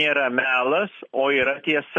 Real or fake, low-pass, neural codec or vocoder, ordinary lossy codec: real; 9.9 kHz; none; MP3, 32 kbps